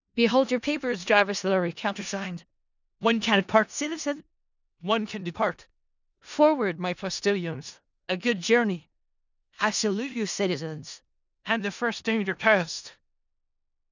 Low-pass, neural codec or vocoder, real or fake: 7.2 kHz; codec, 16 kHz in and 24 kHz out, 0.4 kbps, LongCat-Audio-Codec, four codebook decoder; fake